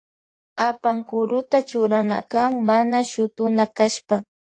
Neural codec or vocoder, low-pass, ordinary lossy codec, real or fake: codec, 16 kHz in and 24 kHz out, 1.1 kbps, FireRedTTS-2 codec; 9.9 kHz; AAC, 48 kbps; fake